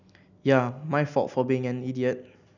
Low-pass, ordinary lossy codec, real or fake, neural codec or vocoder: 7.2 kHz; none; real; none